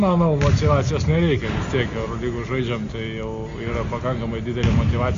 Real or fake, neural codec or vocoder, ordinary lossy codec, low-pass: real; none; MP3, 48 kbps; 7.2 kHz